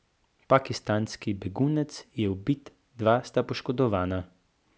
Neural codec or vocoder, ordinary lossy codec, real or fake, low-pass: none; none; real; none